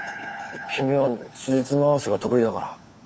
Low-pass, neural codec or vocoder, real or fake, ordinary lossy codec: none; codec, 16 kHz, 4 kbps, FunCodec, trained on LibriTTS, 50 frames a second; fake; none